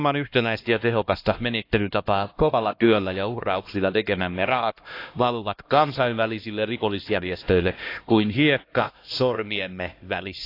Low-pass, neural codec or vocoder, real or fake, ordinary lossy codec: 5.4 kHz; codec, 16 kHz, 1 kbps, X-Codec, HuBERT features, trained on LibriSpeech; fake; AAC, 32 kbps